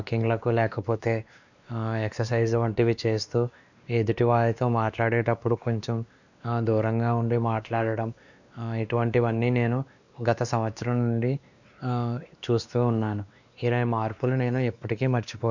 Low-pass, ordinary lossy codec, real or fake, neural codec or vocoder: 7.2 kHz; none; fake; codec, 16 kHz, 2 kbps, X-Codec, WavLM features, trained on Multilingual LibriSpeech